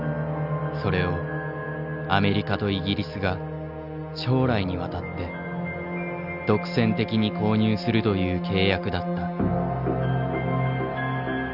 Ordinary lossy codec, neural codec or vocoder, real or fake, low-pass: none; none; real; 5.4 kHz